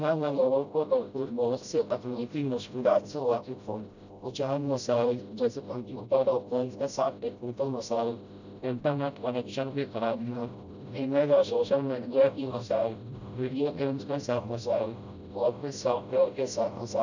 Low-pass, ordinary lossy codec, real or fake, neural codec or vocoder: 7.2 kHz; none; fake; codec, 16 kHz, 0.5 kbps, FreqCodec, smaller model